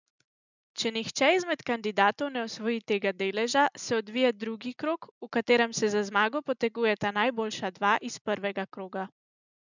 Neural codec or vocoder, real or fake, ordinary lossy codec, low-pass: none; real; none; 7.2 kHz